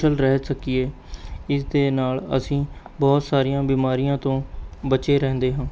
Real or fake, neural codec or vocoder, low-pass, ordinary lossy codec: real; none; none; none